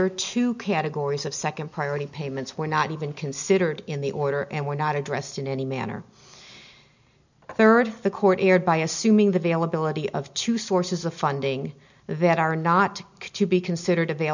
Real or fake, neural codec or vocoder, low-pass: real; none; 7.2 kHz